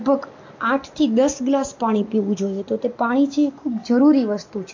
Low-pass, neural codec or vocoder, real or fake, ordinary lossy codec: 7.2 kHz; none; real; MP3, 48 kbps